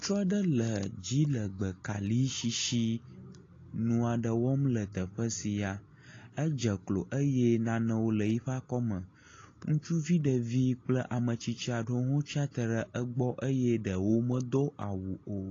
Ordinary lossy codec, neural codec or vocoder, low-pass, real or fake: AAC, 32 kbps; none; 7.2 kHz; real